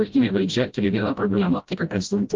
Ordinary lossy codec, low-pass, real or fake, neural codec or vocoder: Opus, 32 kbps; 7.2 kHz; fake; codec, 16 kHz, 0.5 kbps, FreqCodec, smaller model